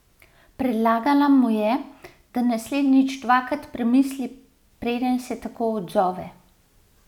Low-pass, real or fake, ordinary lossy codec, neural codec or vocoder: 19.8 kHz; real; none; none